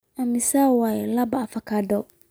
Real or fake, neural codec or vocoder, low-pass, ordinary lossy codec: real; none; none; none